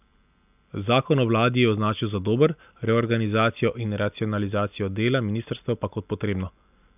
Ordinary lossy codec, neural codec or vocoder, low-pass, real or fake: none; none; 3.6 kHz; real